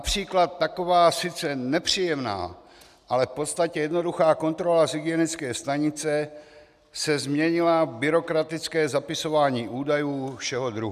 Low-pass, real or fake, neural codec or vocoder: 14.4 kHz; real; none